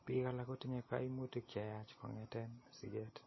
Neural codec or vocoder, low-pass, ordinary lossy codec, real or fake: none; 7.2 kHz; MP3, 24 kbps; real